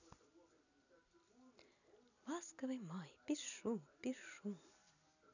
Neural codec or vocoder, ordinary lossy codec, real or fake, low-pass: none; none; real; 7.2 kHz